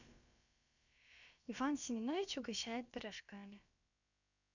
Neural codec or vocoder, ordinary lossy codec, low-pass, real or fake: codec, 16 kHz, about 1 kbps, DyCAST, with the encoder's durations; none; 7.2 kHz; fake